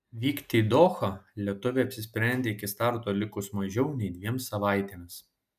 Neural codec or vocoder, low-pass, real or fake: none; 14.4 kHz; real